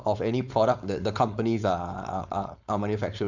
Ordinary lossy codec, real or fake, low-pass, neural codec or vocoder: none; fake; 7.2 kHz; codec, 16 kHz, 4.8 kbps, FACodec